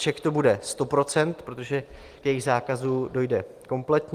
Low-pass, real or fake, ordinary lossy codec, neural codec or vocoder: 14.4 kHz; real; Opus, 24 kbps; none